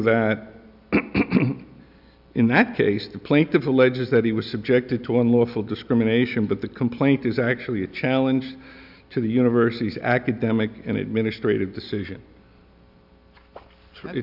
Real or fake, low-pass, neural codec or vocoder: real; 5.4 kHz; none